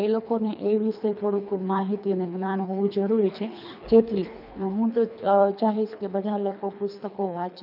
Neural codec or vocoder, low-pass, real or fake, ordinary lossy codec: codec, 24 kHz, 3 kbps, HILCodec; 5.4 kHz; fake; none